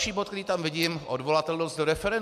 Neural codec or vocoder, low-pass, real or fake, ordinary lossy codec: none; 14.4 kHz; real; MP3, 96 kbps